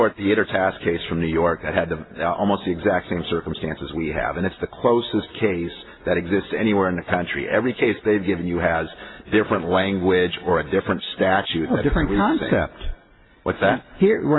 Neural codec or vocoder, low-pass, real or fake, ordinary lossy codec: none; 7.2 kHz; real; AAC, 16 kbps